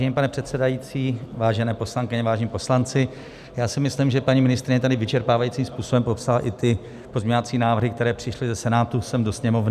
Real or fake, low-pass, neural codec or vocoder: real; 14.4 kHz; none